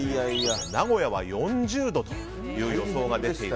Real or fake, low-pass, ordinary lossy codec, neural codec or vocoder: real; none; none; none